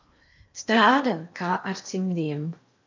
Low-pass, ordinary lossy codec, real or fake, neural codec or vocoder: 7.2 kHz; MP3, 64 kbps; fake; codec, 16 kHz in and 24 kHz out, 0.8 kbps, FocalCodec, streaming, 65536 codes